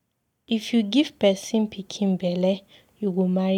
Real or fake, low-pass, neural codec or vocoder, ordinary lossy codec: real; 19.8 kHz; none; none